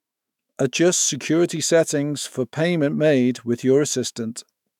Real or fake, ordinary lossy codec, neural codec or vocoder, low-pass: fake; none; autoencoder, 48 kHz, 128 numbers a frame, DAC-VAE, trained on Japanese speech; 19.8 kHz